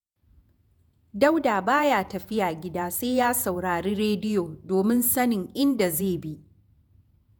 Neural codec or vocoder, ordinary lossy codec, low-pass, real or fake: vocoder, 48 kHz, 128 mel bands, Vocos; none; none; fake